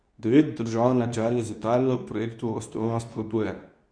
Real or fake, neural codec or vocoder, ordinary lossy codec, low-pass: fake; codec, 24 kHz, 0.9 kbps, WavTokenizer, medium speech release version 2; none; 9.9 kHz